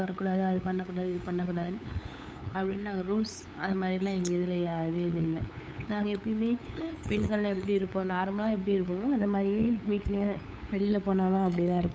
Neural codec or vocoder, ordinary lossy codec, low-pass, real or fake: codec, 16 kHz, 8 kbps, FunCodec, trained on LibriTTS, 25 frames a second; none; none; fake